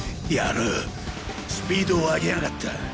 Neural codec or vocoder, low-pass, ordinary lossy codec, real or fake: none; none; none; real